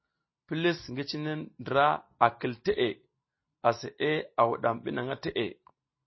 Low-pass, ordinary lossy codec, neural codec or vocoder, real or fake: 7.2 kHz; MP3, 24 kbps; none; real